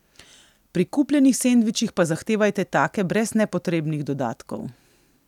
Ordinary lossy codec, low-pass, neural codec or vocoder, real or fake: none; 19.8 kHz; none; real